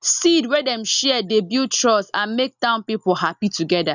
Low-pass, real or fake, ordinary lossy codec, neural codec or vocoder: 7.2 kHz; real; none; none